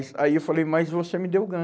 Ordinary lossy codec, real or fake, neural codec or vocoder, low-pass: none; real; none; none